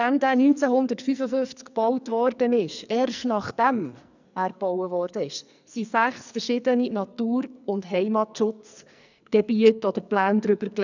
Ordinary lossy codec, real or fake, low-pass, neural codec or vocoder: none; fake; 7.2 kHz; codec, 44.1 kHz, 2.6 kbps, SNAC